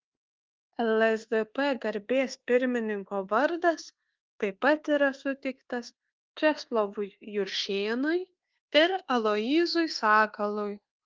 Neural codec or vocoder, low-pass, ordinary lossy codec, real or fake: codec, 24 kHz, 1.2 kbps, DualCodec; 7.2 kHz; Opus, 16 kbps; fake